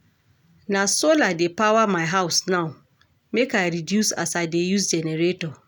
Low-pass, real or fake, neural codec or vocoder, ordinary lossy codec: none; real; none; none